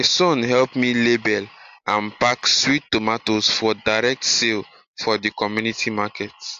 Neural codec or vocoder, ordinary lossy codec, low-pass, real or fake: none; AAC, 64 kbps; 7.2 kHz; real